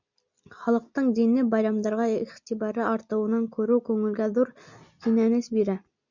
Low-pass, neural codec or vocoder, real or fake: 7.2 kHz; none; real